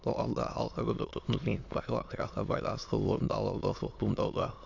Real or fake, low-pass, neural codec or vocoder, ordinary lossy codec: fake; 7.2 kHz; autoencoder, 22.05 kHz, a latent of 192 numbers a frame, VITS, trained on many speakers; AAC, 48 kbps